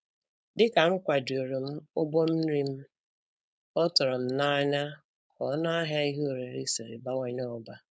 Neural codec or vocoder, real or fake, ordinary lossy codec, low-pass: codec, 16 kHz, 4.8 kbps, FACodec; fake; none; none